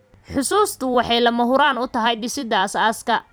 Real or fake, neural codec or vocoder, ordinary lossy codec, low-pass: fake; vocoder, 44.1 kHz, 128 mel bands every 256 samples, BigVGAN v2; none; none